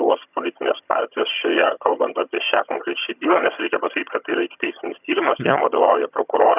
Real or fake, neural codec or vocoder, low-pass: fake; vocoder, 22.05 kHz, 80 mel bands, HiFi-GAN; 3.6 kHz